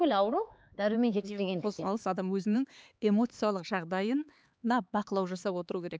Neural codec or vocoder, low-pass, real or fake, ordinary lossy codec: codec, 16 kHz, 4 kbps, X-Codec, HuBERT features, trained on LibriSpeech; none; fake; none